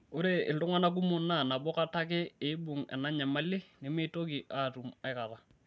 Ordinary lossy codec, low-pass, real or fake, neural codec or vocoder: none; none; real; none